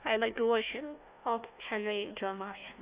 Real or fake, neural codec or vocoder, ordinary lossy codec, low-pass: fake; codec, 16 kHz, 1 kbps, FunCodec, trained on Chinese and English, 50 frames a second; Opus, 64 kbps; 3.6 kHz